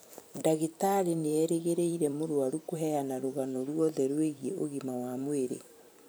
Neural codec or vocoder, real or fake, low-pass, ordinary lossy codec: vocoder, 44.1 kHz, 128 mel bands every 512 samples, BigVGAN v2; fake; none; none